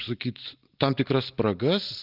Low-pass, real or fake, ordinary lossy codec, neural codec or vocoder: 5.4 kHz; real; Opus, 32 kbps; none